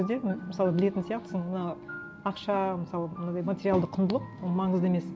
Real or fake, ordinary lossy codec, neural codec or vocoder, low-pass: real; none; none; none